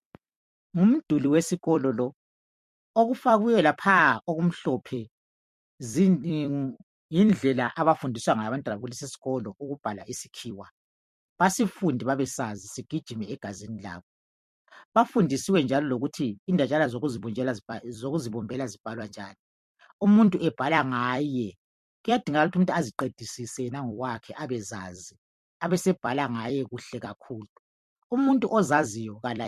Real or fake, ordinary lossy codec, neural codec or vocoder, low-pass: fake; MP3, 64 kbps; vocoder, 44.1 kHz, 128 mel bands every 256 samples, BigVGAN v2; 14.4 kHz